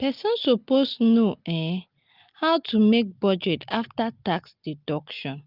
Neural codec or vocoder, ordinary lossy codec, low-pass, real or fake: none; Opus, 24 kbps; 5.4 kHz; real